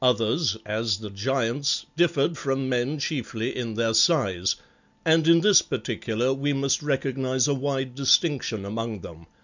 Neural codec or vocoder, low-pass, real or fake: none; 7.2 kHz; real